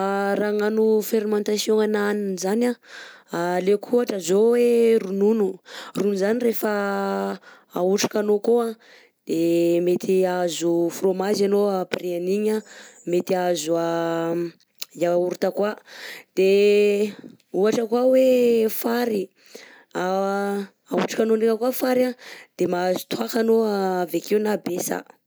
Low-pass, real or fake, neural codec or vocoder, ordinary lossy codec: none; real; none; none